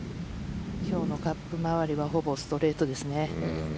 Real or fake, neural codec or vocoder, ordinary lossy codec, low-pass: real; none; none; none